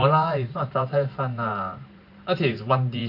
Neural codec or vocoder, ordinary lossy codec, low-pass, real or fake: vocoder, 44.1 kHz, 128 mel bands every 512 samples, BigVGAN v2; none; 5.4 kHz; fake